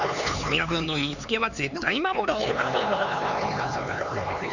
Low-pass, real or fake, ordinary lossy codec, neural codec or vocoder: 7.2 kHz; fake; none; codec, 16 kHz, 4 kbps, X-Codec, HuBERT features, trained on LibriSpeech